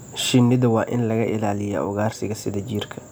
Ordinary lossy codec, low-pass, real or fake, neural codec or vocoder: none; none; real; none